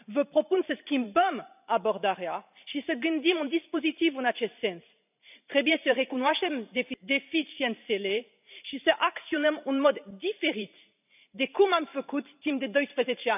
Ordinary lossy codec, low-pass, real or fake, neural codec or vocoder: none; 3.6 kHz; fake; vocoder, 44.1 kHz, 128 mel bands every 512 samples, BigVGAN v2